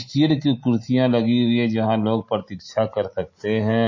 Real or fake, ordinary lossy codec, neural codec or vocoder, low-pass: real; MP3, 32 kbps; none; 7.2 kHz